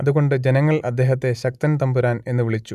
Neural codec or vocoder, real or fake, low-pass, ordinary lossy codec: none; real; 14.4 kHz; none